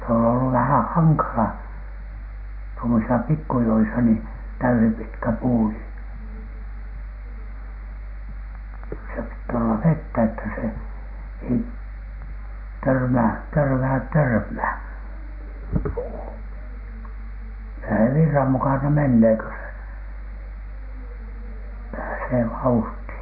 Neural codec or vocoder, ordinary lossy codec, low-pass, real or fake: none; none; 5.4 kHz; real